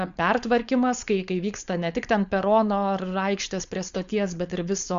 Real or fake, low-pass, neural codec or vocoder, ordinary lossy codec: fake; 7.2 kHz; codec, 16 kHz, 4.8 kbps, FACodec; Opus, 64 kbps